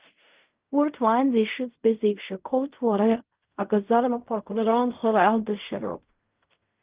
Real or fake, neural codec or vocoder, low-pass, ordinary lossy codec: fake; codec, 16 kHz in and 24 kHz out, 0.4 kbps, LongCat-Audio-Codec, fine tuned four codebook decoder; 3.6 kHz; Opus, 24 kbps